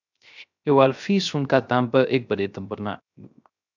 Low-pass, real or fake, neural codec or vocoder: 7.2 kHz; fake; codec, 16 kHz, 0.3 kbps, FocalCodec